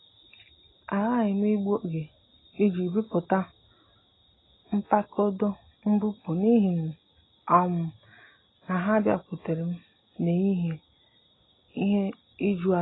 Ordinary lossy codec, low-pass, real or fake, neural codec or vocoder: AAC, 16 kbps; 7.2 kHz; real; none